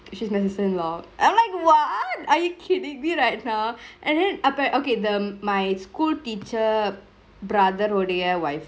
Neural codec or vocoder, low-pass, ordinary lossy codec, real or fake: none; none; none; real